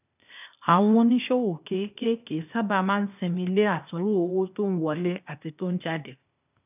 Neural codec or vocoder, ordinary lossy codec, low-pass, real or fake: codec, 16 kHz, 0.8 kbps, ZipCodec; none; 3.6 kHz; fake